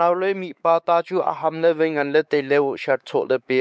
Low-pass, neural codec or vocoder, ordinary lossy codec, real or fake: none; codec, 16 kHz, 4 kbps, X-Codec, WavLM features, trained on Multilingual LibriSpeech; none; fake